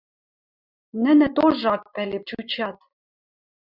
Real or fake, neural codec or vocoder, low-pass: real; none; 5.4 kHz